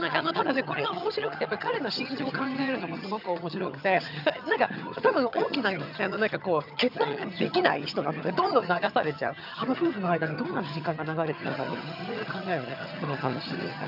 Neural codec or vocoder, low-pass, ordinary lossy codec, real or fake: vocoder, 22.05 kHz, 80 mel bands, HiFi-GAN; 5.4 kHz; none; fake